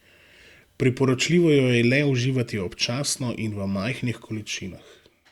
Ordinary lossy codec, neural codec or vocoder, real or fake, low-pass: Opus, 64 kbps; none; real; 19.8 kHz